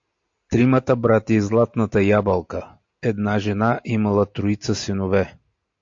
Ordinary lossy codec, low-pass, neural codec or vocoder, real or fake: AAC, 48 kbps; 7.2 kHz; none; real